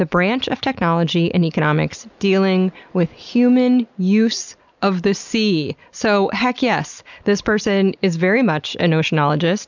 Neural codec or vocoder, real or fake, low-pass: none; real; 7.2 kHz